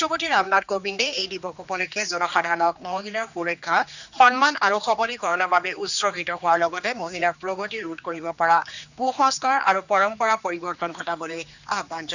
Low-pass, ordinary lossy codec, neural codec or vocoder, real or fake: 7.2 kHz; none; codec, 16 kHz, 2 kbps, X-Codec, HuBERT features, trained on general audio; fake